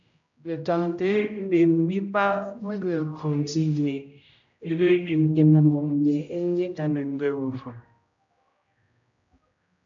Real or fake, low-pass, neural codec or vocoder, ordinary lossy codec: fake; 7.2 kHz; codec, 16 kHz, 0.5 kbps, X-Codec, HuBERT features, trained on general audio; MP3, 64 kbps